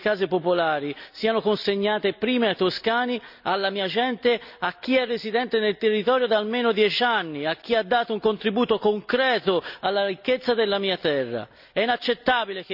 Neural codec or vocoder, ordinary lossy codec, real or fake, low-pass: none; none; real; 5.4 kHz